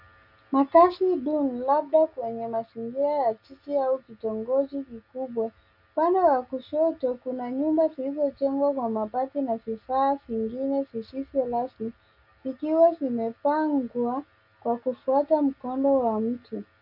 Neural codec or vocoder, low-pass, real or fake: none; 5.4 kHz; real